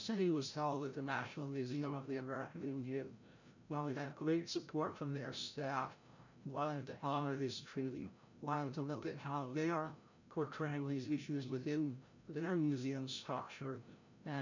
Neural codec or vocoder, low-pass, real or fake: codec, 16 kHz, 0.5 kbps, FreqCodec, larger model; 7.2 kHz; fake